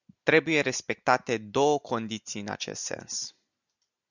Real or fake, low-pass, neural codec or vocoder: real; 7.2 kHz; none